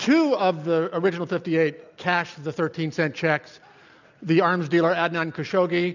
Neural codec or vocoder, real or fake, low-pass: none; real; 7.2 kHz